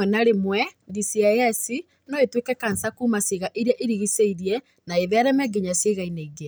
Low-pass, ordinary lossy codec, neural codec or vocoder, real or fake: none; none; none; real